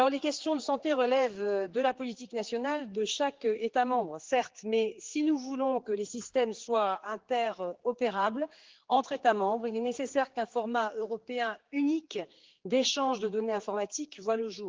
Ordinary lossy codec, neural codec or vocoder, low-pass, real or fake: Opus, 16 kbps; codec, 16 kHz, 4 kbps, X-Codec, HuBERT features, trained on general audio; 7.2 kHz; fake